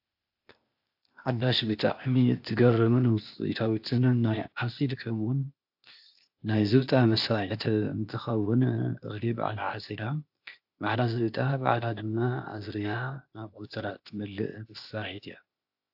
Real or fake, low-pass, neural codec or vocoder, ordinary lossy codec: fake; 5.4 kHz; codec, 16 kHz, 0.8 kbps, ZipCodec; AAC, 48 kbps